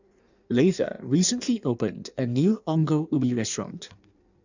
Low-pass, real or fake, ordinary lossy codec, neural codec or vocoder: 7.2 kHz; fake; none; codec, 16 kHz in and 24 kHz out, 1.1 kbps, FireRedTTS-2 codec